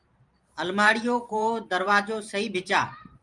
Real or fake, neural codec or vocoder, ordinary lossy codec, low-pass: fake; vocoder, 44.1 kHz, 128 mel bands every 512 samples, BigVGAN v2; Opus, 32 kbps; 10.8 kHz